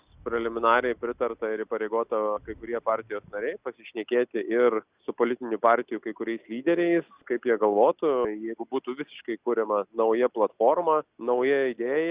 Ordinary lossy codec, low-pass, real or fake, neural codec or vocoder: Opus, 64 kbps; 3.6 kHz; real; none